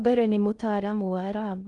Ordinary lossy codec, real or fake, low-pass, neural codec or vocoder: Opus, 32 kbps; fake; 10.8 kHz; codec, 16 kHz in and 24 kHz out, 0.6 kbps, FocalCodec, streaming, 2048 codes